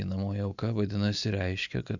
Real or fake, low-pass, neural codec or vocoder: real; 7.2 kHz; none